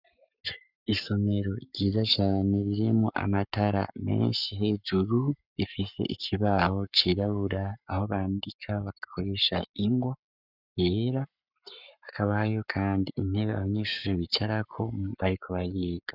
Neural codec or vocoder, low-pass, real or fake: codec, 44.1 kHz, 7.8 kbps, Pupu-Codec; 5.4 kHz; fake